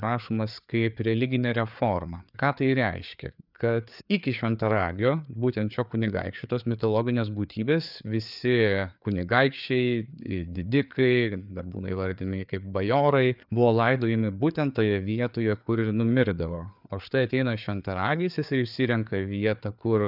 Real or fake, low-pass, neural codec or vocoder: fake; 5.4 kHz; codec, 16 kHz, 4 kbps, FreqCodec, larger model